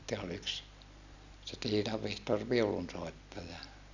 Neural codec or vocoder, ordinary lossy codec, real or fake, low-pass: none; none; real; 7.2 kHz